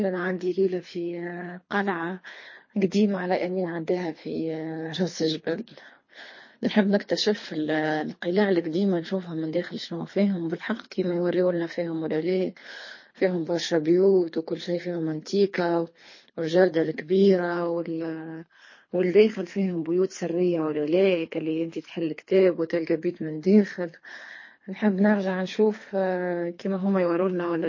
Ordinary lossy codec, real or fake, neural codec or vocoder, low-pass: MP3, 32 kbps; fake; codec, 24 kHz, 3 kbps, HILCodec; 7.2 kHz